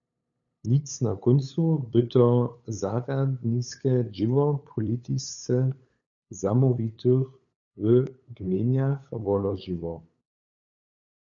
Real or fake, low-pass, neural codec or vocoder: fake; 7.2 kHz; codec, 16 kHz, 8 kbps, FunCodec, trained on LibriTTS, 25 frames a second